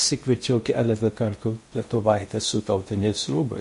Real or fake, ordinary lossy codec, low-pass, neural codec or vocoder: fake; MP3, 48 kbps; 10.8 kHz; codec, 16 kHz in and 24 kHz out, 0.8 kbps, FocalCodec, streaming, 65536 codes